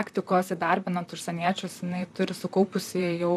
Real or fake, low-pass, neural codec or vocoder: fake; 14.4 kHz; vocoder, 44.1 kHz, 128 mel bands, Pupu-Vocoder